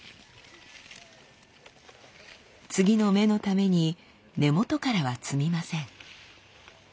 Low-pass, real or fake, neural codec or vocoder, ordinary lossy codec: none; real; none; none